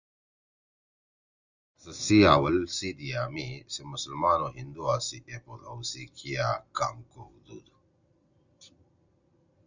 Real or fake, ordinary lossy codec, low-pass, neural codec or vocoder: real; Opus, 64 kbps; 7.2 kHz; none